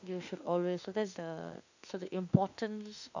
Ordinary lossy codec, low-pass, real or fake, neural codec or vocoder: none; 7.2 kHz; fake; autoencoder, 48 kHz, 32 numbers a frame, DAC-VAE, trained on Japanese speech